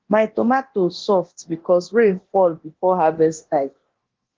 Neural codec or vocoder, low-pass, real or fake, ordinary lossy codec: codec, 24 kHz, 0.9 kbps, DualCodec; 7.2 kHz; fake; Opus, 16 kbps